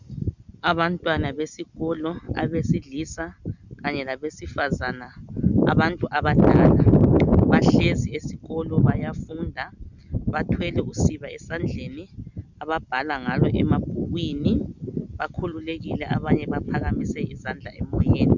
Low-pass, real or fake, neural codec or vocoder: 7.2 kHz; real; none